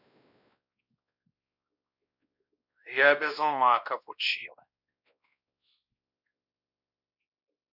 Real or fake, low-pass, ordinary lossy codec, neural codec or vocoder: fake; 5.4 kHz; none; codec, 16 kHz, 1 kbps, X-Codec, WavLM features, trained on Multilingual LibriSpeech